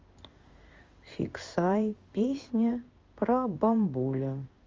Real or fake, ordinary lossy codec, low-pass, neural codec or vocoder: fake; Opus, 32 kbps; 7.2 kHz; codec, 16 kHz in and 24 kHz out, 1 kbps, XY-Tokenizer